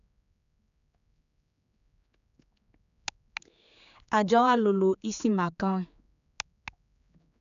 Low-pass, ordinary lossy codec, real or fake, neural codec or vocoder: 7.2 kHz; none; fake; codec, 16 kHz, 4 kbps, X-Codec, HuBERT features, trained on general audio